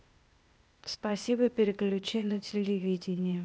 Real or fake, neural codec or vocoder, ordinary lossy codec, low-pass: fake; codec, 16 kHz, 0.8 kbps, ZipCodec; none; none